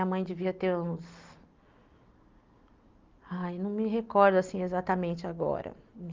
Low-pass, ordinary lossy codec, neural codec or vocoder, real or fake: 7.2 kHz; Opus, 32 kbps; none; real